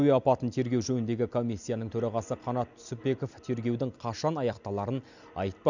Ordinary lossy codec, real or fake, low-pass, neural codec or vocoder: none; real; 7.2 kHz; none